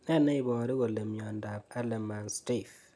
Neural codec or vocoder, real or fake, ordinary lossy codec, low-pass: none; real; none; none